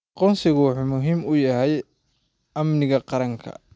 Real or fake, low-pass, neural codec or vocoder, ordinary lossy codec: real; none; none; none